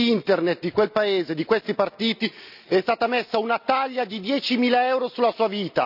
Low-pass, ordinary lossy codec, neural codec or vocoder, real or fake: 5.4 kHz; none; none; real